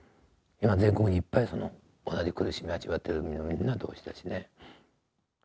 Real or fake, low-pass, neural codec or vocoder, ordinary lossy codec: real; none; none; none